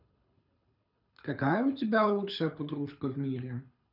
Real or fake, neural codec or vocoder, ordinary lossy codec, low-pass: fake; codec, 24 kHz, 6 kbps, HILCodec; none; 5.4 kHz